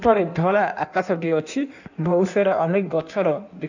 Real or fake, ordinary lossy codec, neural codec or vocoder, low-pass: fake; none; codec, 16 kHz in and 24 kHz out, 1.1 kbps, FireRedTTS-2 codec; 7.2 kHz